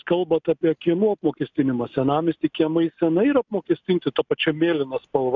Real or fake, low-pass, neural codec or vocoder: real; 7.2 kHz; none